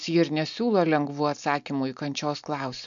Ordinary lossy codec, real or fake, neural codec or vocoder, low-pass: MP3, 64 kbps; real; none; 7.2 kHz